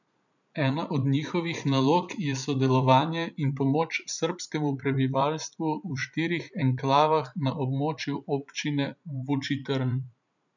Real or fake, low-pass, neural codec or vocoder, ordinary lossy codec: fake; 7.2 kHz; vocoder, 44.1 kHz, 80 mel bands, Vocos; none